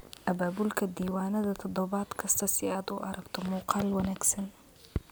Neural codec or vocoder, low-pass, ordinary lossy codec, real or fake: none; none; none; real